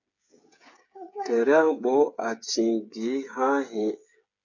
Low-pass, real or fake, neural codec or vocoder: 7.2 kHz; fake; codec, 16 kHz, 8 kbps, FreqCodec, smaller model